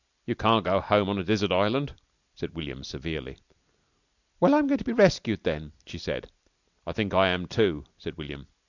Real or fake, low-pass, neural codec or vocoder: real; 7.2 kHz; none